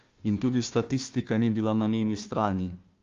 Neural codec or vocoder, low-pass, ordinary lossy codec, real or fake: codec, 16 kHz, 1 kbps, FunCodec, trained on Chinese and English, 50 frames a second; 7.2 kHz; Opus, 32 kbps; fake